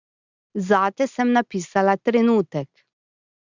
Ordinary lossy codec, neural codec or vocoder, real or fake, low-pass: Opus, 64 kbps; none; real; 7.2 kHz